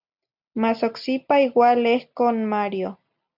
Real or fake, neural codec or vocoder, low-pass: real; none; 5.4 kHz